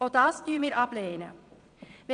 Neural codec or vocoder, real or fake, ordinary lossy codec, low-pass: vocoder, 22.05 kHz, 80 mel bands, Vocos; fake; none; 9.9 kHz